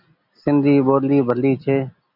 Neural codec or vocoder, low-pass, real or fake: none; 5.4 kHz; real